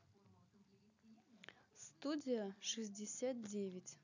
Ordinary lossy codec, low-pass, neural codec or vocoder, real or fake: none; 7.2 kHz; none; real